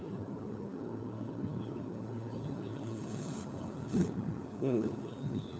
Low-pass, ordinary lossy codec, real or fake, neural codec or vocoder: none; none; fake; codec, 16 kHz, 4 kbps, FunCodec, trained on LibriTTS, 50 frames a second